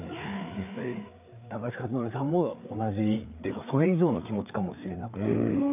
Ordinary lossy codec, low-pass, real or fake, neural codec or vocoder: AAC, 32 kbps; 3.6 kHz; fake; codec, 16 kHz, 4 kbps, FreqCodec, larger model